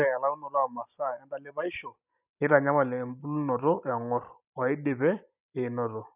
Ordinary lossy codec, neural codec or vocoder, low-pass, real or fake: none; none; 3.6 kHz; real